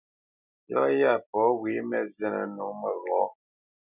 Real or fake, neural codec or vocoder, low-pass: real; none; 3.6 kHz